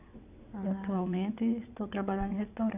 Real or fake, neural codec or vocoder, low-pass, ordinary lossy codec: fake; vocoder, 22.05 kHz, 80 mel bands, WaveNeXt; 3.6 kHz; AAC, 32 kbps